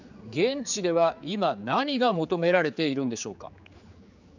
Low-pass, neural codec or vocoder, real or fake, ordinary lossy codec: 7.2 kHz; codec, 16 kHz, 4 kbps, X-Codec, HuBERT features, trained on general audio; fake; none